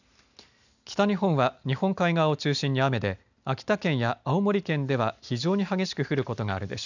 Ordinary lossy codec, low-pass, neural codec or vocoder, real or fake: none; 7.2 kHz; none; real